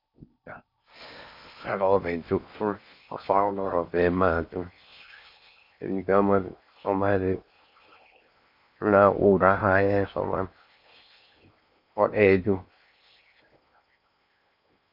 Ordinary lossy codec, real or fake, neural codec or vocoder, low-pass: MP3, 48 kbps; fake; codec, 16 kHz in and 24 kHz out, 0.6 kbps, FocalCodec, streaming, 4096 codes; 5.4 kHz